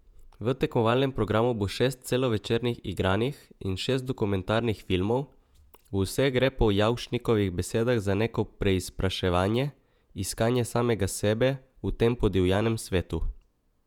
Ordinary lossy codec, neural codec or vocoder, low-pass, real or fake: none; vocoder, 48 kHz, 128 mel bands, Vocos; 19.8 kHz; fake